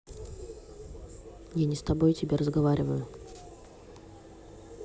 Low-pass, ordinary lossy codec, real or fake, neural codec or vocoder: none; none; real; none